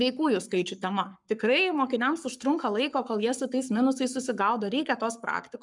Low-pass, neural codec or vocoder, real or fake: 10.8 kHz; codec, 44.1 kHz, 7.8 kbps, Pupu-Codec; fake